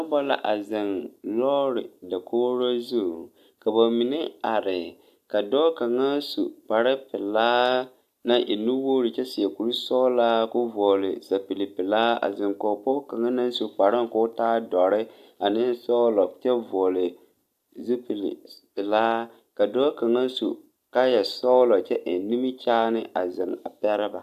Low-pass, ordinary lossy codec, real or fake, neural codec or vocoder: 14.4 kHz; AAC, 96 kbps; real; none